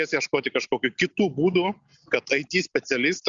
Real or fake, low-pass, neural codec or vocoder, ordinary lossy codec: real; 7.2 kHz; none; Opus, 64 kbps